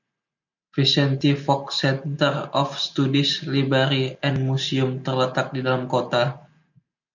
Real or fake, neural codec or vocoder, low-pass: real; none; 7.2 kHz